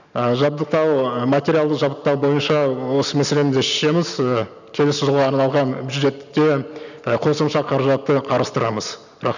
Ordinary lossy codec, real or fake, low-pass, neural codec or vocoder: none; real; 7.2 kHz; none